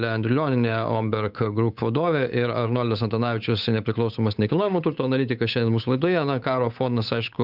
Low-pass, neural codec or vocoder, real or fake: 5.4 kHz; codec, 16 kHz, 8 kbps, FunCodec, trained on Chinese and English, 25 frames a second; fake